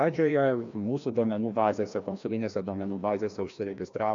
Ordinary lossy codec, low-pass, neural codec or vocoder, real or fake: AAC, 64 kbps; 7.2 kHz; codec, 16 kHz, 1 kbps, FreqCodec, larger model; fake